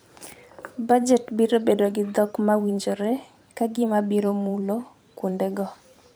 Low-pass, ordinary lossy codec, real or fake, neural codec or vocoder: none; none; fake; vocoder, 44.1 kHz, 128 mel bands every 512 samples, BigVGAN v2